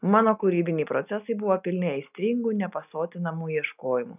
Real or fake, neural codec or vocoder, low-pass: real; none; 3.6 kHz